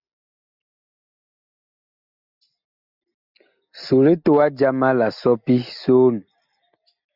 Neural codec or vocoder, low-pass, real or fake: none; 5.4 kHz; real